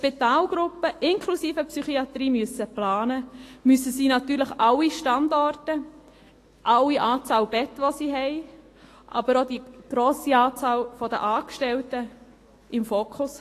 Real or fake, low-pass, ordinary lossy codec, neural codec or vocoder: fake; 14.4 kHz; AAC, 48 kbps; autoencoder, 48 kHz, 128 numbers a frame, DAC-VAE, trained on Japanese speech